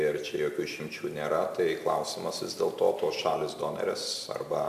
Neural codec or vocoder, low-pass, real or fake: none; 14.4 kHz; real